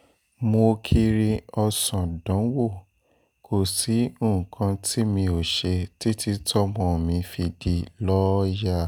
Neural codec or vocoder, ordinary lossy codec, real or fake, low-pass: none; none; real; none